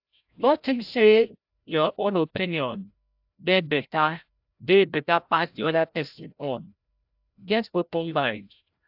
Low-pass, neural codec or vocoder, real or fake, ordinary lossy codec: 5.4 kHz; codec, 16 kHz, 0.5 kbps, FreqCodec, larger model; fake; AAC, 48 kbps